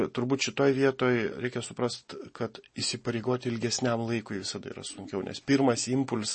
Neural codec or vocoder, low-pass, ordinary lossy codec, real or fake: none; 10.8 kHz; MP3, 32 kbps; real